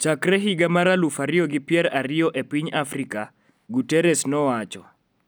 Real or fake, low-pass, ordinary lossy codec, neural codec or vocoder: real; none; none; none